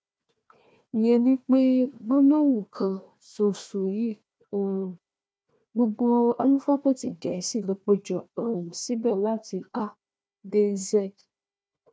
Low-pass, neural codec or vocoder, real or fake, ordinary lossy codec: none; codec, 16 kHz, 1 kbps, FunCodec, trained on Chinese and English, 50 frames a second; fake; none